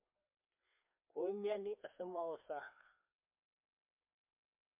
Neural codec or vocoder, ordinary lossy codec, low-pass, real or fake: codec, 16 kHz, 2 kbps, FreqCodec, larger model; MP3, 32 kbps; 3.6 kHz; fake